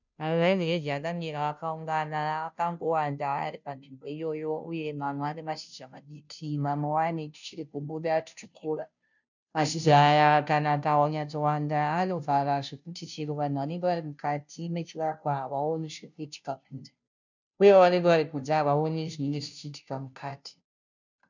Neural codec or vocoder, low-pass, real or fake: codec, 16 kHz, 0.5 kbps, FunCodec, trained on Chinese and English, 25 frames a second; 7.2 kHz; fake